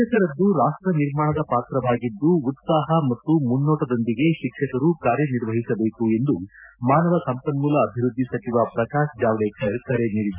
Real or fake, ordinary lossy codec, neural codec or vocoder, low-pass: real; none; none; 3.6 kHz